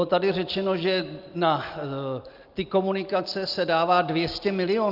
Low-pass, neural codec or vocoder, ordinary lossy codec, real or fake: 5.4 kHz; none; Opus, 24 kbps; real